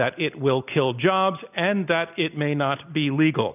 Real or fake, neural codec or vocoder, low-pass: real; none; 3.6 kHz